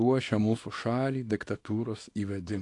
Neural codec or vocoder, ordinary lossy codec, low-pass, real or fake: codec, 24 kHz, 0.9 kbps, WavTokenizer, small release; AAC, 48 kbps; 10.8 kHz; fake